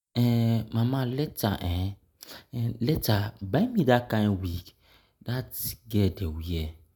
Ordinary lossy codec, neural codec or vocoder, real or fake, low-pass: none; none; real; none